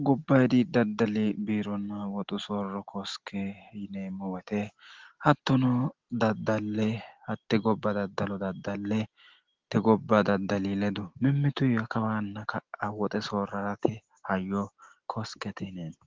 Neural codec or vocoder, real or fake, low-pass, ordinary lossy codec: none; real; 7.2 kHz; Opus, 16 kbps